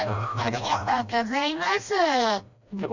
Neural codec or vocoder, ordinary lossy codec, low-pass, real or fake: codec, 16 kHz, 1 kbps, FreqCodec, smaller model; none; 7.2 kHz; fake